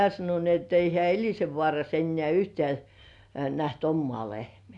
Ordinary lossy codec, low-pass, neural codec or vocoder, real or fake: none; 10.8 kHz; none; real